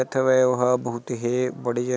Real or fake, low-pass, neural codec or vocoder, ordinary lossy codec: real; none; none; none